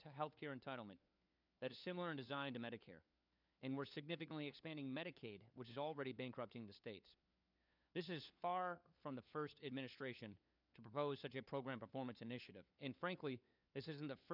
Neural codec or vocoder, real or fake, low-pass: codec, 16 kHz, 4 kbps, FunCodec, trained on LibriTTS, 50 frames a second; fake; 5.4 kHz